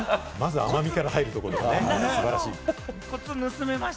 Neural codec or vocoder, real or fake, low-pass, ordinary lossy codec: none; real; none; none